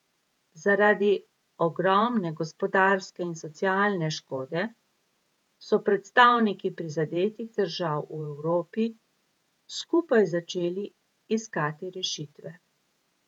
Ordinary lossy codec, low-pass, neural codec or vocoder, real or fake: none; 19.8 kHz; vocoder, 48 kHz, 128 mel bands, Vocos; fake